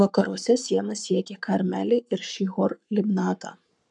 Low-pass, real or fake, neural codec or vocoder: 10.8 kHz; fake; codec, 44.1 kHz, 7.8 kbps, Pupu-Codec